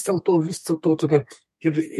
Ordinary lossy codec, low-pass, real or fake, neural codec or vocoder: MP3, 64 kbps; 14.4 kHz; fake; codec, 32 kHz, 1.9 kbps, SNAC